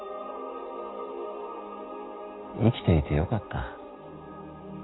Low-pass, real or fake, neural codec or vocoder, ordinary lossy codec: 7.2 kHz; real; none; AAC, 16 kbps